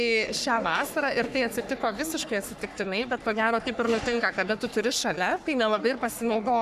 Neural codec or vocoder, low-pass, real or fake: codec, 44.1 kHz, 3.4 kbps, Pupu-Codec; 14.4 kHz; fake